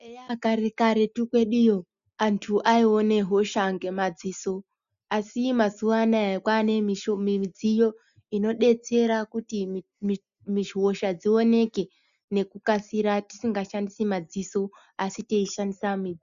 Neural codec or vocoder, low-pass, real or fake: none; 7.2 kHz; real